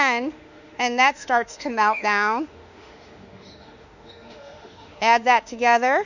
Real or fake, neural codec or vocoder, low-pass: fake; autoencoder, 48 kHz, 32 numbers a frame, DAC-VAE, trained on Japanese speech; 7.2 kHz